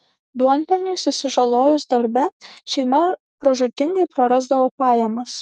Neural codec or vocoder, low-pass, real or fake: codec, 44.1 kHz, 2.6 kbps, SNAC; 10.8 kHz; fake